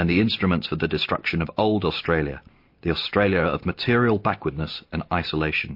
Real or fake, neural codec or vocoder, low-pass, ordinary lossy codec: real; none; 5.4 kHz; MP3, 32 kbps